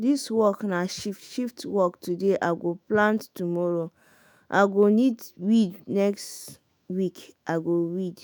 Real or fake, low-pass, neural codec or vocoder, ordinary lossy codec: fake; none; autoencoder, 48 kHz, 128 numbers a frame, DAC-VAE, trained on Japanese speech; none